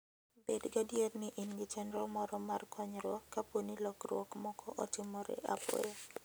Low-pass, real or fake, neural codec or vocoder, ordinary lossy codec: none; real; none; none